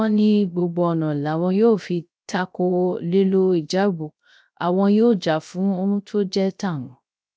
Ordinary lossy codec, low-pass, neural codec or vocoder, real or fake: none; none; codec, 16 kHz, 0.3 kbps, FocalCodec; fake